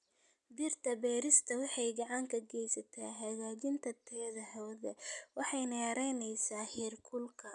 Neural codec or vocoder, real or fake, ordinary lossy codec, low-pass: none; real; none; 10.8 kHz